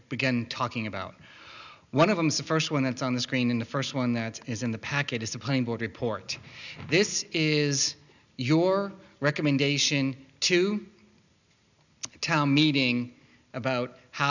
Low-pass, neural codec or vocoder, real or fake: 7.2 kHz; none; real